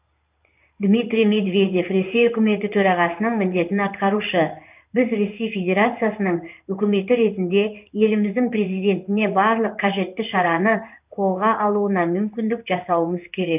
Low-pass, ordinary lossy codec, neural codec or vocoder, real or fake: 3.6 kHz; none; codec, 16 kHz in and 24 kHz out, 1 kbps, XY-Tokenizer; fake